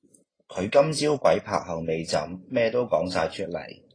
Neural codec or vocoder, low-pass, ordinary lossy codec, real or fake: none; 9.9 kHz; AAC, 32 kbps; real